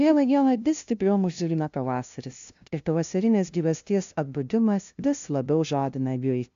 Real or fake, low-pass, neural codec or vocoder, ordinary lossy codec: fake; 7.2 kHz; codec, 16 kHz, 0.5 kbps, FunCodec, trained on LibriTTS, 25 frames a second; AAC, 64 kbps